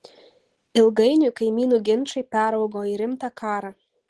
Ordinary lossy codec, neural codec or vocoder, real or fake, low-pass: Opus, 16 kbps; none; real; 10.8 kHz